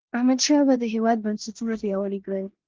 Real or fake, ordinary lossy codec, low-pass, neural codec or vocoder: fake; Opus, 16 kbps; 7.2 kHz; codec, 16 kHz in and 24 kHz out, 0.9 kbps, LongCat-Audio-Codec, four codebook decoder